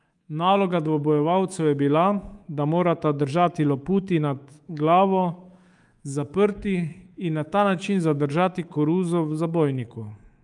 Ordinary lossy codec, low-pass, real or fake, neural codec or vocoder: Opus, 32 kbps; 10.8 kHz; fake; codec, 24 kHz, 3.1 kbps, DualCodec